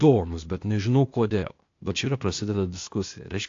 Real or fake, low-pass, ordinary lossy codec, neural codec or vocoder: fake; 7.2 kHz; AAC, 48 kbps; codec, 16 kHz, 0.8 kbps, ZipCodec